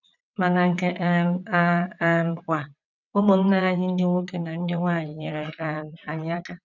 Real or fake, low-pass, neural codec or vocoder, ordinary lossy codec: fake; 7.2 kHz; vocoder, 22.05 kHz, 80 mel bands, WaveNeXt; none